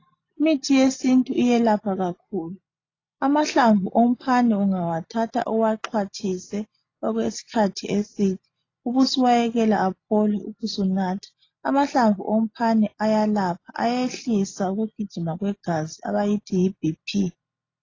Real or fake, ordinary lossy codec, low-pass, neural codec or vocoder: real; AAC, 32 kbps; 7.2 kHz; none